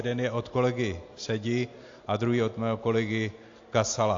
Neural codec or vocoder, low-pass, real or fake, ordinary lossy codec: none; 7.2 kHz; real; AAC, 48 kbps